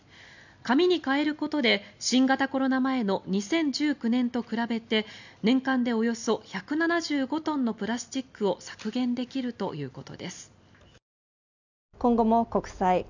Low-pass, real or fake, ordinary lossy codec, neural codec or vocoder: 7.2 kHz; real; none; none